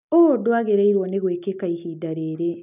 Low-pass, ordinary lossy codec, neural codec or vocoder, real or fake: 3.6 kHz; none; none; real